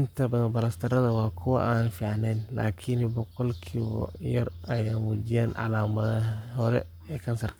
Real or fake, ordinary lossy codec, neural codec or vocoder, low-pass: fake; none; codec, 44.1 kHz, 7.8 kbps, Pupu-Codec; none